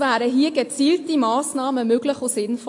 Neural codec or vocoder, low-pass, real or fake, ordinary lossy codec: none; 10.8 kHz; real; AAC, 48 kbps